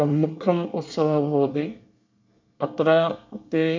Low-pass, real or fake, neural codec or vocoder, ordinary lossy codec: 7.2 kHz; fake; codec, 24 kHz, 1 kbps, SNAC; MP3, 64 kbps